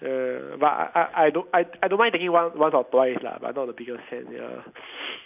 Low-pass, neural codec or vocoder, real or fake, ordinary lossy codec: 3.6 kHz; none; real; none